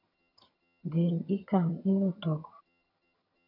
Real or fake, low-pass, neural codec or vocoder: fake; 5.4 kHz; vocoder, 22.05 kHz, 80 mel bands, HiFi-GAN